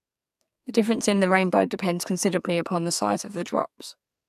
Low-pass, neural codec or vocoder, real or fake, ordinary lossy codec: 14.4 kHz; codec, 44.1 kHz, 2.6 kbps, SNAC; fake; none